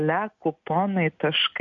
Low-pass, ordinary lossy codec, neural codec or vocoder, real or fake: 7.2 kHz; MP3, 48 kbps; none; real